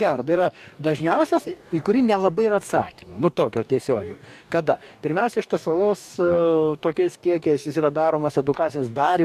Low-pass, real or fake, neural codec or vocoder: 14.4 kHz; fake; codec, 44.1 kHz, 2.6 kbps, DAC